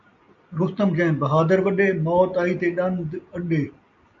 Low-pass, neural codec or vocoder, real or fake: 7.2 kHz; none; real